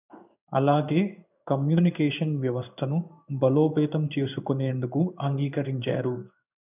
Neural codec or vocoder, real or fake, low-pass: codec, 16 kHz in and 24 kHz out, 1 kbps, XY-Tokenizer; fake; 3.6 kHz